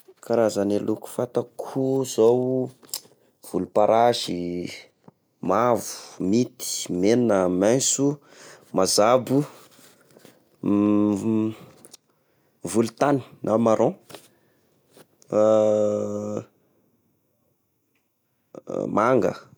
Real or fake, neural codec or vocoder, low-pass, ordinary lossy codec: real; none; none; none